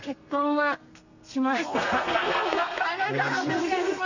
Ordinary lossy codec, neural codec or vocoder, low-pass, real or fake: none; codec, 32 kHz, 1.9 kbps, SNAC; 7.2 kHz; fake